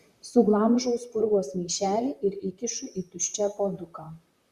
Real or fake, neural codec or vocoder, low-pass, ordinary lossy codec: fake; vocoder, 44.1 kHz, 128 mel bands, Pupu-Vocoder; 14.4 kHz; Opus, 64 kbps